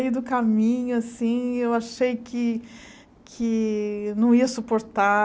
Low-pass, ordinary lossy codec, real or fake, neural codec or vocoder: none; none; real; none